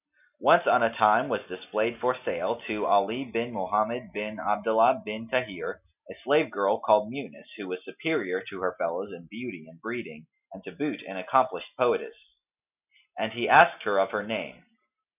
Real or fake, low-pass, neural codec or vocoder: real; 3.6 kHz; none